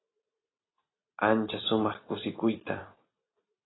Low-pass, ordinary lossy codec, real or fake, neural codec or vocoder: 7.2 kHz; AAC, 16 kbps; fake; vocoder, 44.1 kHz, 128 mel bands every 256 samples, BigVGAN v2